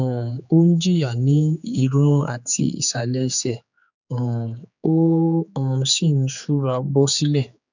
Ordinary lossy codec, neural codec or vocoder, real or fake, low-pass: none; codec, 16 kHz, 4 kbps, X-Codec, HuBERT features, trained on general audio; fake; 7.2 kHz